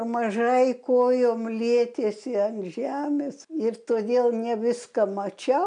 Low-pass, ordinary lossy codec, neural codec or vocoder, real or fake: 9.9 kHz; MP3, 96 kbps; none; real